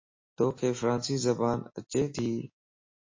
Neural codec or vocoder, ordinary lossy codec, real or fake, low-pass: none; MP3, 32 kbps; real; 7.2 kHz